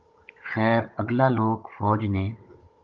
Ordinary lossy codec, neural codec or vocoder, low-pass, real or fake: Opus, 24 kbps; codec, 16 kHz, 16 kbps, FunCodec, trained on Chinese and English, 50 frames a second; 7.2 kHz; fake